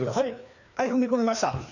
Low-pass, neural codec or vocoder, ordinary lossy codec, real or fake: 7.2 kHz; codec, 16 kHz, 2 kbps, FreqCodec, larger model; none; fake